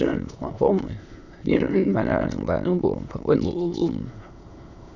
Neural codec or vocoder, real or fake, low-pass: autoencoder, 22.05 kHz, a latent of 192 numbers a frame, VITS, trained on many speakers; fake; 7.2 kHz